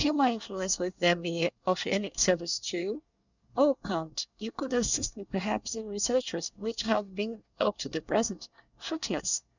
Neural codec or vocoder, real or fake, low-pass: codec, 24 kHz, 1 kbps, SNAC; fake; 7.2 kHz